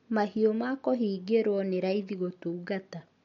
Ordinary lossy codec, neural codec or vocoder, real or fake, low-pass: MP3, 48 kbps; none; real; 7.2 kHz